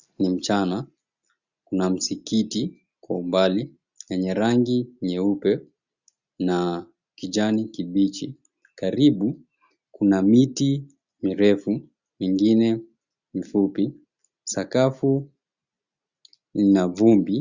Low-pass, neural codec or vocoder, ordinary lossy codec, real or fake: 7.2 kHz; none; Opus, 64 kbps; real